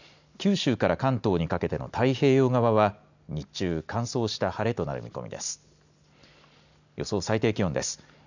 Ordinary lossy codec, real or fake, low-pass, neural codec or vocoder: none; real; 7.2 kHz; none